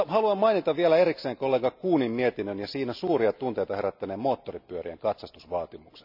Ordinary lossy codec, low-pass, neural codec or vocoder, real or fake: none; 5.4 kHz; none; real